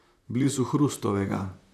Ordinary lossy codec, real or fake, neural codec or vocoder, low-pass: none; fake; vocoder, 44.1 kHz, 128 mel bands, Pupu-Vocoder; 14.4 kHz